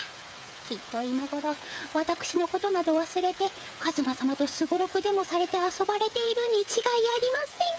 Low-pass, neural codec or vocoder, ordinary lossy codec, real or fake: none; codec, 16 kHz, 8 kbps, FreqCodec, smaller model; none; fake